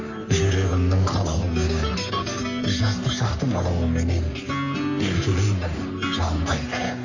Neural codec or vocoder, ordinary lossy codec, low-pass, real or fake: codec, 44.1 kHz, 3.4 kbps, Pupu-Codec; none; 7.2 kHz; fake